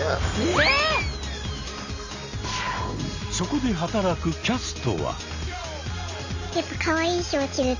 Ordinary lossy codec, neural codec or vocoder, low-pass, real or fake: Opus, 64 kbps; none; 7.2 kHz; real